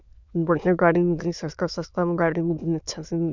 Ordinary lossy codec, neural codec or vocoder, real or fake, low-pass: none; autoencoder, 22.05 kHz, a latent of 192 numbers a frame, VITS, trained on many speakers; fake; 7.2 kHz